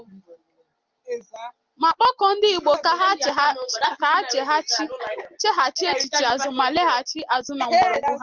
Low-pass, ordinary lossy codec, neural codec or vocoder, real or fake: 7.2 kHz; Opus, 32 kbps; none; real